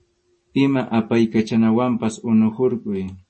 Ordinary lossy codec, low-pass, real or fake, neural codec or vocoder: MP3, 32 kbps; 10.8 kHz; real; none